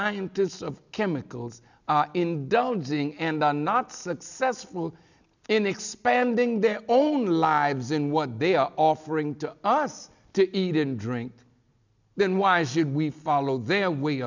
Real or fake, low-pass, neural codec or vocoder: real; 7.2 kHz; none